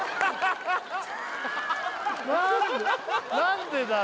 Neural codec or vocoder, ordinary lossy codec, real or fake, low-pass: none; none; real; none